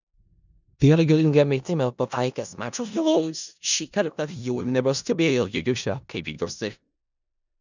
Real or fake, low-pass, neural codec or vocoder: fake; 7.2 kHz; codec, 16 kHz in and 24 kHz out, 0.4 kbps, LongCat-Audio-Codec, four codebook decoder